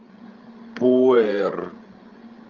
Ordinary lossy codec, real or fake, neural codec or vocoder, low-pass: Opus, 32 kbps; fake; codec, 16 kHz, 16 kbps, FreqCodec, larger model; 7.2 kHz